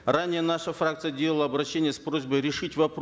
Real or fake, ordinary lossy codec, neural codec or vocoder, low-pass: real; none; none; none